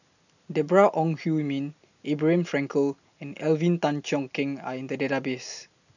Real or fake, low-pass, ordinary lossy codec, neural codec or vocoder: real; 7.2 kHz; none; none